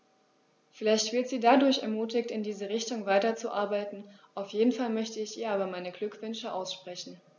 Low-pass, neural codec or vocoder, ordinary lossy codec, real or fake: 7.2 kHz; none; none; real